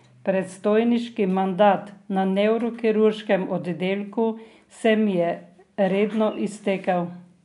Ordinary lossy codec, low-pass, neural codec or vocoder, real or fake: none; 10.8 kHz; none; real